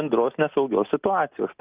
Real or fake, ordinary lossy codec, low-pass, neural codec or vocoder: real; Opus, 32 kbps; 3.6 kHz; none